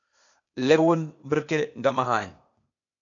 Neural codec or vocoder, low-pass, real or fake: codec, 16 kHz, 0.8 kbps, ZipCodec; 7.2 kHz; fake